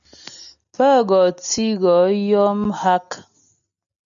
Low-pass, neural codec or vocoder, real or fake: 7.2 kHz; none; real